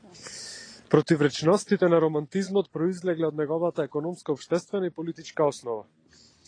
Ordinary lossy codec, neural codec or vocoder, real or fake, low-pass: AAC, 32 kbps; none; real; 9.9 kHz